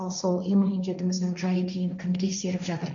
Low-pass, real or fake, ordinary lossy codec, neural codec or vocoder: 7.2 kHz; fake; none; codec, 16 kHz, 1.1 kbps, Voila-Tokenizer